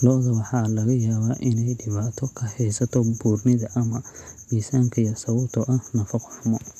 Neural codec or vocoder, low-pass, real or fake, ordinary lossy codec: none; 14.4 kHz; real; none